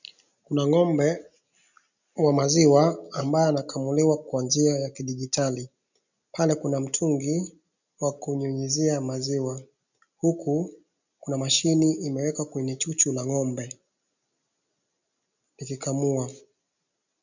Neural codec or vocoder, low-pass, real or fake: none; 7.2 kHz; real